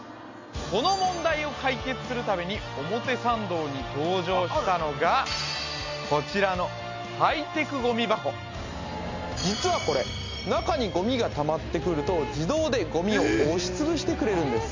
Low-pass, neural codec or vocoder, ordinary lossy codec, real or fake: 7.2 kHz; none; MP3, 64 kbps; real